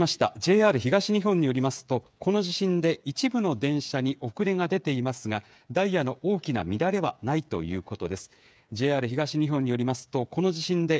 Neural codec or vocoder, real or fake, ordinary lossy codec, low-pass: codec, 16 kHz, 8 kbps, FreqCodec, smaller model; fake; none; none